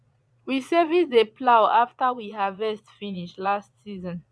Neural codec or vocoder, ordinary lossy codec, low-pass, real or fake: vocoder, 22.05 kHz, 80 mel bands, Vocos; none; none; fake